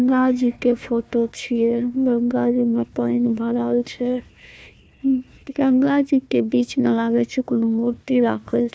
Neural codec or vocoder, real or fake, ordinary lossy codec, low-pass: codec, 16 kHz, 1 kbps, FunCodec, trained on Chinese and English, 50 frames a second; fake; none; none